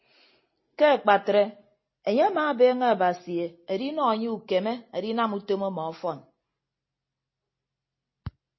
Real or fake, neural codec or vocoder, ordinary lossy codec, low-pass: real; none; MP3, 24 kbps; 7.2 kHz